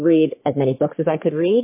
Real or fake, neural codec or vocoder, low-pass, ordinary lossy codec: fake; codec, 44.1 kHz, 3.4 kbps, Pupu-Codec; 3.6 kHz; MP3, 24 kbps